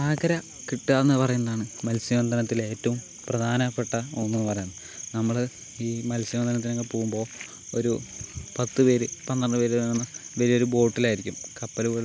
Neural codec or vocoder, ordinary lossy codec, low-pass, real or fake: none; none; none; real